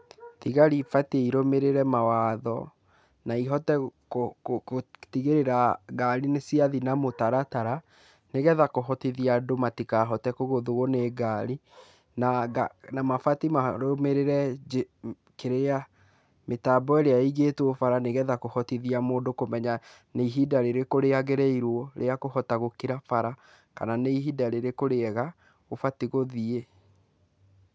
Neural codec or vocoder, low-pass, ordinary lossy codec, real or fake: none; none; none; real